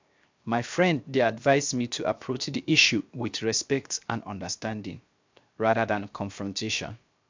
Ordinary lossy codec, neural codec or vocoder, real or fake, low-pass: MP3, 64 kbps; codec, 16 kHz, 0.7 kbps, FocalCodec; fake; 7.2 kHz